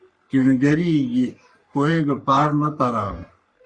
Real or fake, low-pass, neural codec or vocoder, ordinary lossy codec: fake; 9.9 kHz; codec, 44.1 kHz, 3.4 kbps, Pupu-Codec; Opus, 64 kbps